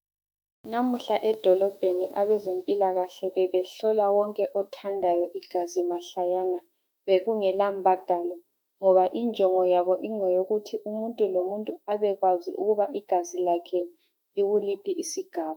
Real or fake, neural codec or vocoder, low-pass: fake; autoencoder, 48 kHz, 32 numbers a frame, DAC-VAE, trained on Japanese speech; 19.8 kHz